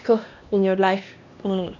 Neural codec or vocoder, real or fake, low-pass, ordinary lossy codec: codec, 16 kHz, 1 kbps, X-Codec, HuBERT features, trained on LibriSpeech; fake; 7.2 kHz; none